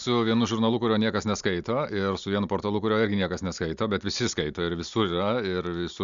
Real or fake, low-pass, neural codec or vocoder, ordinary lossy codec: real; 7.2 kHz; none; Opus, 64 kbps